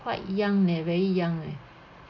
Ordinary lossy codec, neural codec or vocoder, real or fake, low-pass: none; none; real; 7.2 kHz